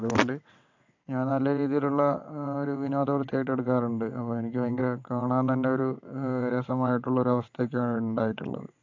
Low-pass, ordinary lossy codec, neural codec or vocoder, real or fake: 7.2 kHz; AAC, 48 kbps; vocoder, 22.05 kHz, 80 mel bands, WaveNeXt; fake